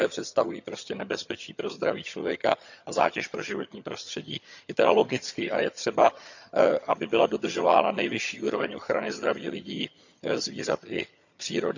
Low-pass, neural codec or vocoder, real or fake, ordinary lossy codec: 7.2 kHz; vocoder, 22.05 kHz, 80 mel bands, HiFi-GAN; fake; none